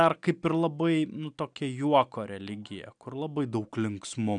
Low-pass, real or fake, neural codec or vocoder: 9.9 kHz; real; none